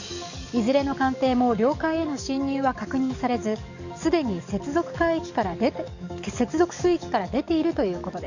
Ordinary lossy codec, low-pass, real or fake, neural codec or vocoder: none; 7.2 kHz; fake; codec, 44.1 kHz, 7.8 kbps, DAC